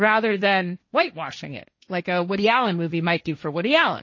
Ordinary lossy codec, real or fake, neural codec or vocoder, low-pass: MP3, 32 kbps; fake; codec, 16 kHz, 1.1 kbps, Voila-Tokenizer; 7.2 kHz